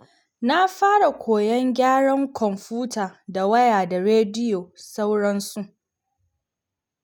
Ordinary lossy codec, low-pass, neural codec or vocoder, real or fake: none; none; none; real